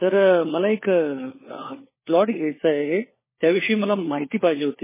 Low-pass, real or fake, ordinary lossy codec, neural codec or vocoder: 3.6 kHz; fake; MP3, 16 kbps; codec, 16 kHz, 4 kbps, FunCodec, trained on LibriTTS, 50 frames a second